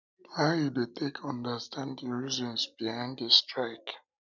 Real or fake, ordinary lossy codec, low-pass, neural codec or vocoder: real; none; 7.2 kHz; none